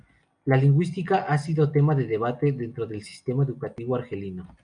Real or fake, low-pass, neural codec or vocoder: real; 9.9 kHz; none